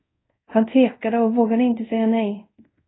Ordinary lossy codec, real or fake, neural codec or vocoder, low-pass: AAC, 16 kbps; fake; codec, 24 kHz, 0.5 kbps, DualCodec; 7.2 kHz